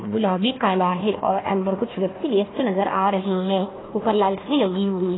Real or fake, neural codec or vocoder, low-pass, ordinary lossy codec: fake; codec, 16 kHz, 1 kbps, FunCodec, trained on Chinese and English, 50 frames a second; 7.2 kHz; AAC, 16 kbps